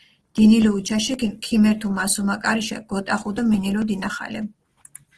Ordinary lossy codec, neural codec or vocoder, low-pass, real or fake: Opus, 16 kbps; none; 10.8 kHz; real